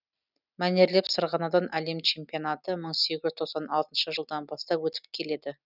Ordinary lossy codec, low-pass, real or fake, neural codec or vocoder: none; 5.4 kHz; real; none